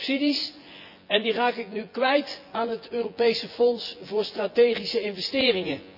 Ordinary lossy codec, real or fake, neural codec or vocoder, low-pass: none; fake; vocoder, 24 kHz, 100 mel bands, Vocos; 5.4 kHz